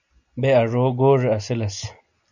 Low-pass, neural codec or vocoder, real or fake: 7.2 kHz; none; real